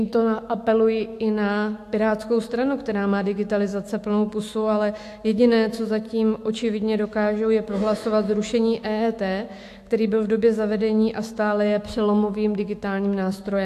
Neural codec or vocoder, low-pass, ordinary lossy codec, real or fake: autoencoder, 48 kHz, 128 numbers a frame, DAC-VAE, trained on Japanese speech; 14.4 kHz; AAC, 64 kbps; fake